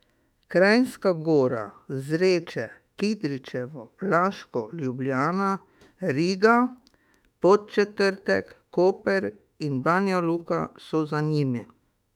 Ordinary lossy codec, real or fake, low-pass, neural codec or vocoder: none; fake; 19.8 kHz; autoencoder, 48 kHz, 32 numbers a frame, DAC-VAE, trained on Japanese speech